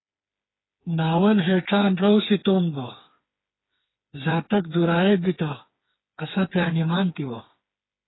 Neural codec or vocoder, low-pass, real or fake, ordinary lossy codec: codec, 16 kHz, 4 kbps, FreqCodec, smaller model; 7.2 kHz; fake; AAC, 16 kbps